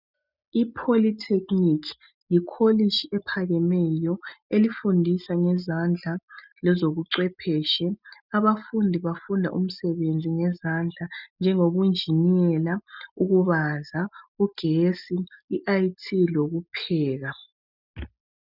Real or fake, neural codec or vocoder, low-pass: real; none; 5.4 kHz